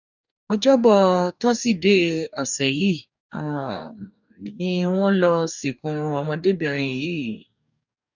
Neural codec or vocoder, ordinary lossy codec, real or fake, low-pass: codec, 44.1 kHz, 2.6 kbps, DAC; none; fake; 7.2 kHz